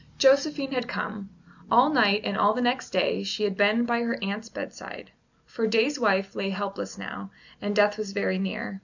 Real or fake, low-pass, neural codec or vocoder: real; 7.2 kHz; none